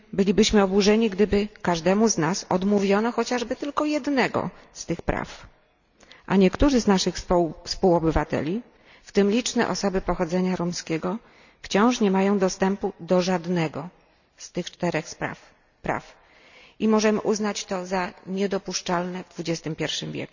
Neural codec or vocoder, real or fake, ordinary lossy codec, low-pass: none; real; none; 7.2 kHz